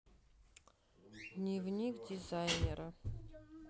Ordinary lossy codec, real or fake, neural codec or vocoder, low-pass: none; real; none; none